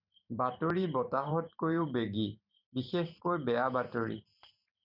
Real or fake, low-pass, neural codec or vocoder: fake; 5.4 kHz; vocoder, 44.1 kHz, 128 mel bands every 512 samples, BigVGAN v2